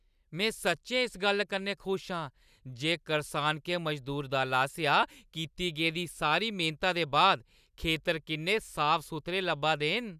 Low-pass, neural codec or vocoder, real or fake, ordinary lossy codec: 14.4 kHz; none; real; none